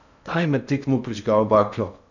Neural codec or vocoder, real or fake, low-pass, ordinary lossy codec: codec, 16 kHz in and 24 kHz out, 0.6 kbps, FocalCodec, streaming, 2048 codes; fake; 7.2 kHz; none